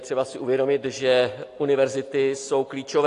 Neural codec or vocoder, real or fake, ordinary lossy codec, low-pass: none; real; AAC, 48 kbps; 10.8 kHz